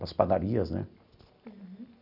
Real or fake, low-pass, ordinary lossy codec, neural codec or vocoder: real; 5.4 kHz; none; none